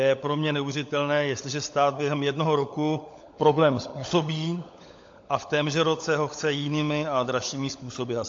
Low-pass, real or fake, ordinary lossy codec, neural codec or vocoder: 7.2 kHz; fake; AAC, 48 kbps; codec, 16 kHz, 16 kbps, FunCodec, trained on LibriTTS, 50 frames a second